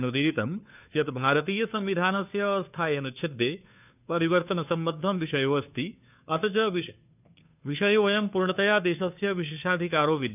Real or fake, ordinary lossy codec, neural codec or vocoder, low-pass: fake; none; codec, 16 kHz, 2 kbps, FunCodec, trained on Chinese and English, 25 frames a second; 3.6 kHz